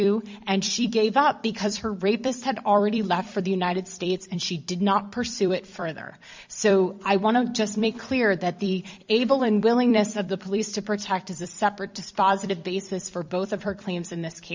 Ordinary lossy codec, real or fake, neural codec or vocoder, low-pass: MP3, 64 kbps; fake; vocoder, 44.1 kHz, 128 mel bands every 256 samples, BigVGAN v2; 7.2 kHz